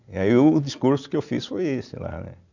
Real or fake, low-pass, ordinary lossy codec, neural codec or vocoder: real; 7.2 kHz; none; none